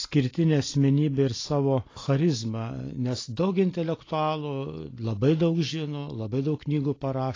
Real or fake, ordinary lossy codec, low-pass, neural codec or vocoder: real; AAC, 32 kbps; 7.2 kHz; none